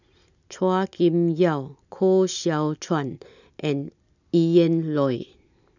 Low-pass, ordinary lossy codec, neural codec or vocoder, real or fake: 7.2 kHz; none; none; real